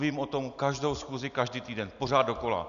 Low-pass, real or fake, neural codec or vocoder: 7.2 kHz; real; none